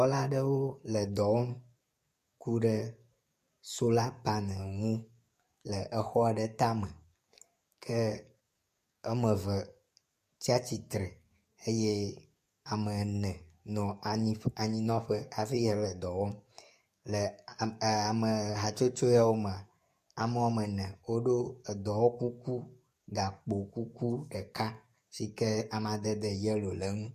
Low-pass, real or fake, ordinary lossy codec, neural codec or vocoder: 14.4 kHz; fake; MP3, 64 kbps; codec, 44.1 kHz, 7.8 kbps, DAC